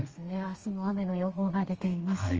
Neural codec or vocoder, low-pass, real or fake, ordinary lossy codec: codec, 32 kHz, 1.9 kbps, SNAC; 7.2 kHz; fake; Opus, 24 kbps